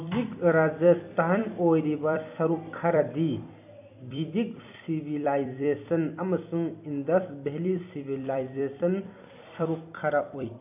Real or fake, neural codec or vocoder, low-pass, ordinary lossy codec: fake; vocoder, 44.1 kHz, 128 mel bands every 256 samples, BigVGAN v2; 3.6 kHz; AAC, 32 kbps